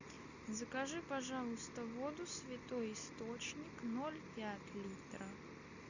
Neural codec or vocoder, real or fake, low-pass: none; real; 7.2 kHz